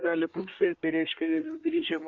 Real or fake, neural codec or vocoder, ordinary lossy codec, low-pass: fake; codec, 24 kHz, 1 kbps, SNAC; Opus, 64 kbps; 7.2 kHz